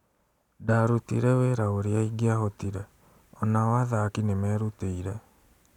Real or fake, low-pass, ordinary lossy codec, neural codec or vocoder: real; 19.8 kHz; none; none